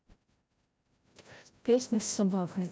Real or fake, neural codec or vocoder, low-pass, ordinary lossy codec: fake; codec, 16 kHz, 0.5 kbps, FreqCodec, larger model; none; none